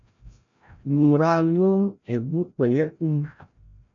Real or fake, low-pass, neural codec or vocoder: fake; 7.2 kHz; codec, 16 kHz, 0.5 kbps, FreqCodec, larger model